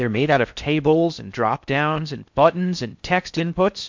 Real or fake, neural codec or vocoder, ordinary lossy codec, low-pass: fake; codec, 16 kHz in and 24 kHz out, 0.6 kbps, FocalCodec, streaming, 4096 codes; MP3, 64 kbps; 7.2 kHz